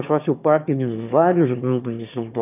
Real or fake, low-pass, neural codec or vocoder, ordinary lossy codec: fake; 3.6 kHz; autoencoder, 22.05 kHz, a latent of 192 numbers a frame, VITS, trained on one speaker; none